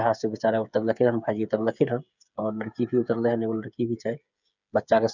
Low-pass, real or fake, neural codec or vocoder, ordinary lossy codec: 7.2 kHz; fake; codec, 16 kHz, 8 kbps, FreqCodec, smaller model; none